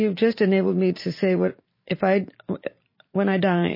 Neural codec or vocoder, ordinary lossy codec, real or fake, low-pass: vocoder, 44.1 kHz, 128 mel bands every 512 samples, BigVGAN v2; MP3, 24 kbps; fake; 5.4 kHz